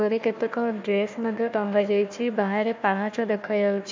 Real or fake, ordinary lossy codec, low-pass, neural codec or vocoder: fake; MP3, 64 kbps; 7.2 kHz; codec, 16 kHz, 1 kbps, FunCodec, trained on Chinese and English, 50 frames a second